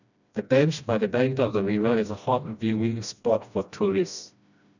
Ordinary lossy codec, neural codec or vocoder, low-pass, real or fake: none; codec, 16 kHz, 1 kbps, FreqCodec, smaller model; 7.2 kHz; fake